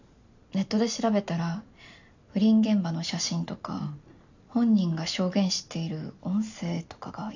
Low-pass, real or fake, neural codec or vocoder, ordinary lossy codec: 7.2 kHz; real; none; none